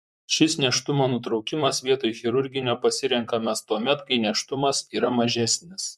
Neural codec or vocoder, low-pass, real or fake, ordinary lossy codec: vocoder, 44.1 kHz, 128 mel bands, Pupu-Vocoder; 14.4 kHz; fake; MP3, 96 kbps